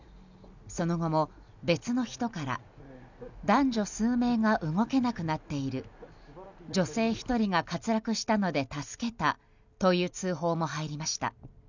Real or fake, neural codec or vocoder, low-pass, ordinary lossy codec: real; none; 7.2 kHz; none